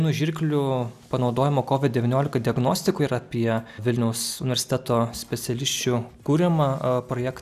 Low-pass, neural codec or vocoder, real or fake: 14.4 kHz; none; real